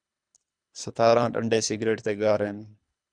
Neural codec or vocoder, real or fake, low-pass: codec, 24 kHz, 3 kbps, HILCodec; fake; 9.9 kHz